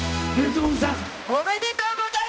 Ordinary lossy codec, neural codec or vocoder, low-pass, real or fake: none; codec, 16 kHz, 1 kbps, X-Codec, HuBERT features, trained on balanced general audio; none; fake